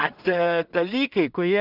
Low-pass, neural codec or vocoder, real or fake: 5.4 kHz; codec, 16 kHz in and 24 kHz out, 0.4 kbps, LongCat-Audio-Codec, two codebook decoder; fake